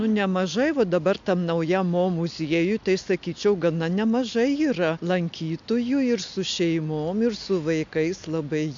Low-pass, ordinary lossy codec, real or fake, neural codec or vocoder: 7.2 kHz; MP3, 64 kbps; real; none